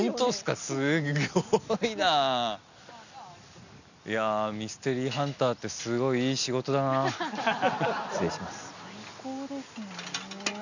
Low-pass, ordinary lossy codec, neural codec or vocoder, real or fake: 7.2 kHz; none; none; real